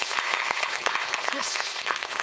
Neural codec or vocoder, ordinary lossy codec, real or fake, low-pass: codec, 16 kHz, 4.8 kbps, FACodec; none; fake; none